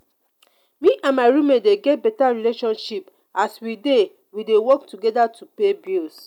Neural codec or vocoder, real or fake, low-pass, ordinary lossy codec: none; real; 19.8 kHz; none